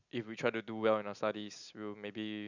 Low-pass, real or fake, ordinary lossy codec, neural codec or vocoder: 7.2 kHz; real; none; none